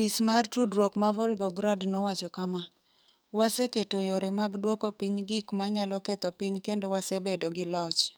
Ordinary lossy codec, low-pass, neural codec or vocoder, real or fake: none; none; codec, 44.1 kHz, 2.6 kbps, SNAC; fake